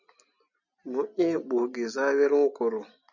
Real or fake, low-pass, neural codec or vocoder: real; 7.2 kHz; none